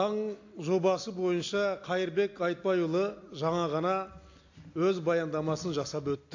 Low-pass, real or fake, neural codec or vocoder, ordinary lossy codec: 7.2 kHz; real; none; AAC, 48 kbps